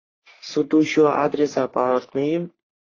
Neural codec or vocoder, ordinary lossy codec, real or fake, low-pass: codec, 44.1 kHz, 3.4 kbps, Pupu-Codec; AAC, 32 kbps; fake; 7.2 kHz